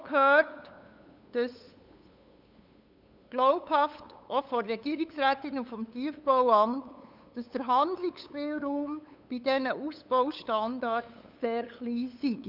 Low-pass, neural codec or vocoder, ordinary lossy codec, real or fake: 5.4 kHz; codec, 16 kHz, 8 kbps, FunCodec, trained on LibriTTS, 25 frames a second; none; fake